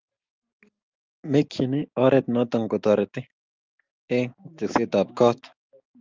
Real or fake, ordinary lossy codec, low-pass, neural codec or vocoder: real; Opus, 24 kbps; 7.2 kHz; none